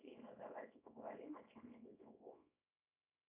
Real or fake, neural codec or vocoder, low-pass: fake; codec, 24 kHz, 0.9 kbps, WavTokenizer, small release; 3.6 kHz